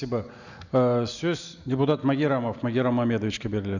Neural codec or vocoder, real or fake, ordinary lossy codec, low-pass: none; real; none; 7.2 kHz